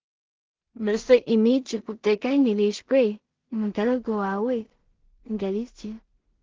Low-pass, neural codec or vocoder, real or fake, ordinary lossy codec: 7.2 kHz; codec, 16 kHz in and 24 kHz out, 0.4 kbps, LongCat-Audio-Codec, two codebook decoder; fake; Opus, 16 kbps